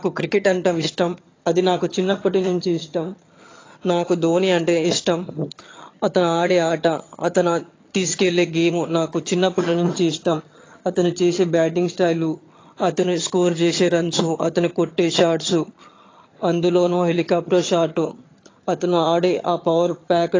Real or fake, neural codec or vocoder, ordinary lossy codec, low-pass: fake; vocoder, 22.05 kHz, 80 mel bands, HiFi-GAN; AAC, 32 kbps; 7.2 kHz